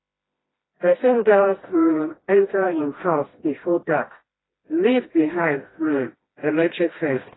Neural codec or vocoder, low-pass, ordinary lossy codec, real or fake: codec, 16 kHz, 1 kbps, FreqCodec, smaller model; 7.2 kHz; AAC, 16 kbps; fake